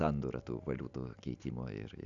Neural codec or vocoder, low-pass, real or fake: none; 7.2 kHz; real